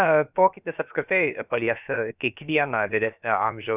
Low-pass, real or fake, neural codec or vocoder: 3.6 kHz; fake; codec, 16 kHz, about 1 kbps, DyCAST, with the encoder's durations